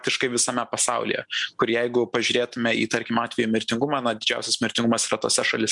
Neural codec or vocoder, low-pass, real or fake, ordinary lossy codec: none; 10.8 kHz; real; MP3, 96 kbps